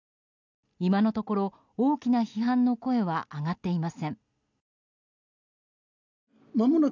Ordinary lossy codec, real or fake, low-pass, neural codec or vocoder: none; real; 7.2 kHz; none